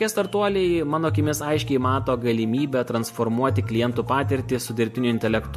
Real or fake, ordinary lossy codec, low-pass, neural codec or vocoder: real; MP3, 64 kbps; 14.4 kHz; none